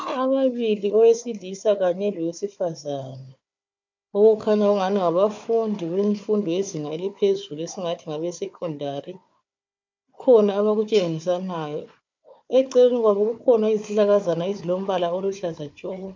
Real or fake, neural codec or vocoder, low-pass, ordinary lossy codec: fake; codec, 16 kHz, 4 kbps, FunCodec, trained on Chinese and English, 50 frames a second; 7.2 kHz; MP3, 64 kbps